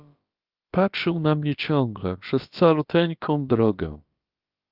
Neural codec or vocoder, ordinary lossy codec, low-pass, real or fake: codec, 16 kHz, about 1 kbps, DyCAST, with the encoder's durations; Opus, 24 kbps; 5.4 kHz; fake